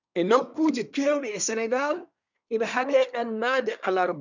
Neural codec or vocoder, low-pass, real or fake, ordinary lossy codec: codec, 24 kHz, 1 kbps, SNAC; 7.2 kHz; fake; none